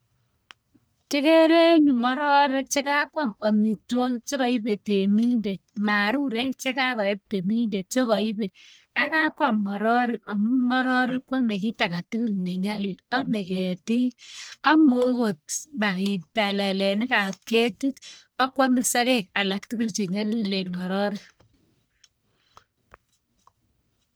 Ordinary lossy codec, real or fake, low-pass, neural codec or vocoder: none; fake; none; codec, 44.1 kHz, 1.7 kbps, Pupu-Codec